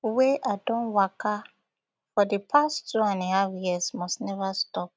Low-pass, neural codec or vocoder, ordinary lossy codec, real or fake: none; none; none; real